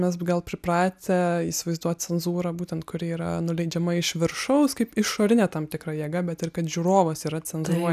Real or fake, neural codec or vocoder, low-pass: real; none; 14.4 kHz